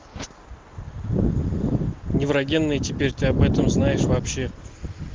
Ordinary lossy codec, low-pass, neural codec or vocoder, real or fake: Opus, 16 kbps; 7.2 kHz; none; real